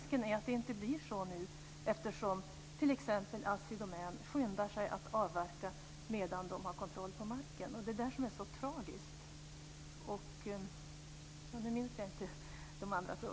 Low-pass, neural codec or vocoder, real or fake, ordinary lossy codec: none; none; real; none